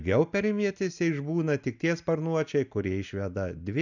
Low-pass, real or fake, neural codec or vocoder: 7.2 kHz; real; none